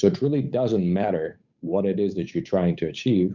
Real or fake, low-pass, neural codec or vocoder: fake; 7.2 kHz; codec, 16 kHz, 8 kbps, FunCodec, trained on Chinese and English, 25 frames a second